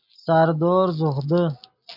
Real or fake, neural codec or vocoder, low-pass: real; none; 5.4 kHz